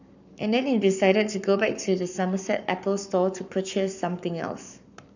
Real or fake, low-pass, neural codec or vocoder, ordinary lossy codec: fake; 7.2 kHz; codec, 44.1 kHz, 7.8 kbps, Pupu-Codec; none